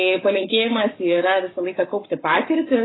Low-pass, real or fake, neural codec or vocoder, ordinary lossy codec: 7.2 kHz; fake; codec, 44.1 kHz, 7.8 kbps, Pupu-Codec; AAC, 16 kbps